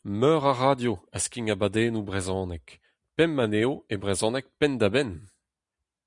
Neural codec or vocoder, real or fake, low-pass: none; real; 10.8 kHz